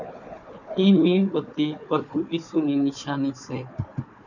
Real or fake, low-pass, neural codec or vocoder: fake; 7.2 kHz; codec, 16 kHz, 4 kbps, FunCodec, trained on Chinese and English, 50 frames a second